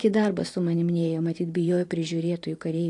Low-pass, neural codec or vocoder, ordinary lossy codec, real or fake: 10.8 kHz; vocoder, 44.1 kHz, 128 mel bands every 512 samples, BigVGAN v2; AAC, 48 kbps; fake